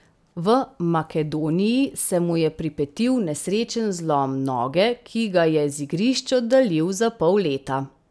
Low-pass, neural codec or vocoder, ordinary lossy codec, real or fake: none; none; none; real